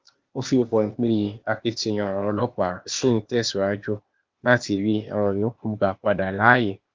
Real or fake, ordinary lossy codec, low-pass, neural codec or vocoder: fake; Opus, 32 kbps; 7.2 kHz; codec, 16 kHz, 0.8 kbps, ZipCodec